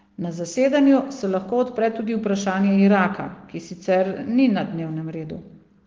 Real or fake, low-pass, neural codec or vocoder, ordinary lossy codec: real; 7.2 kHz; none; Opus, 16 kbps